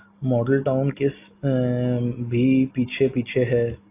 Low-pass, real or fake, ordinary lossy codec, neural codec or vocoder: 3.6 kHz; real; AAC, 24 kbps; none